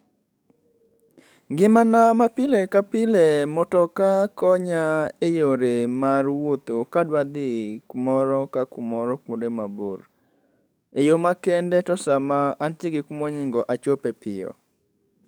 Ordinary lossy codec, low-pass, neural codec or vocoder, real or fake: none; none; codec, 44.1 kHz, 7.8 kbps, DAC; fake